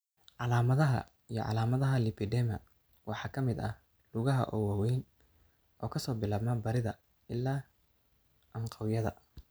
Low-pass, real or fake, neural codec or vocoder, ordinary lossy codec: none; real; none; none